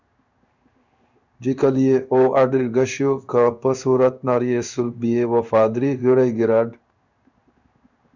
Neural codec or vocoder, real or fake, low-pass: codec, 16 kHz in and 24 kHz out, 1 kbps, XY-Tokenizer; fake; 7.2 kHz